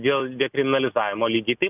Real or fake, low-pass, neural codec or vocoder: real; 3.6 kHz; none